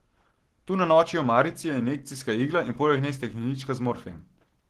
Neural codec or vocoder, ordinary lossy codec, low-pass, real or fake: codec, 44.1 kHz, 7.8 kbps, Pupu-Codec; Opus, 16 kbps; 14.4 kHz; fake